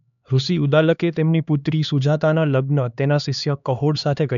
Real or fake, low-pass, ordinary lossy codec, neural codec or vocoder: fake; 7.2 kHz; none; codec, 16 kHz, 2 kbps, X-Codec, HuBERT features, trained on LibriSpeech